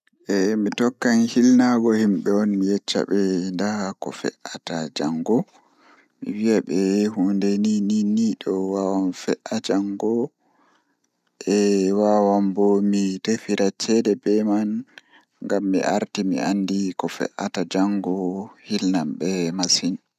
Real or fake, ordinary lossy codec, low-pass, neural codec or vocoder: real; none; 14.4 kHz; none